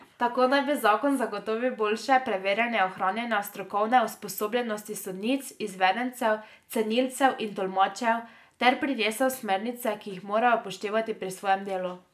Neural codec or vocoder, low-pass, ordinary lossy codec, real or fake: none; 14.4 kHz; MP3, 96 kbps; real